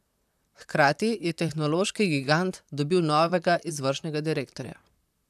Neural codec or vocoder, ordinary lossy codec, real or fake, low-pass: vocoder, 44.1 kHz, 128 mel bands, Pupu-Vocoder; none; fake; 14.4 kHz